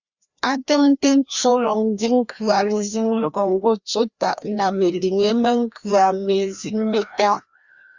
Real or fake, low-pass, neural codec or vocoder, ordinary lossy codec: fake; 7.2 kHz; codec, 16 kHz, 1 kbps, FreqCodec, larger model; Opus, 64 kbps